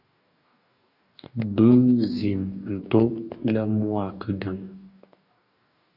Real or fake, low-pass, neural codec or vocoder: fake; 5.4 kHz; codec, 44.1 kHz, 2.6 kbps, DAC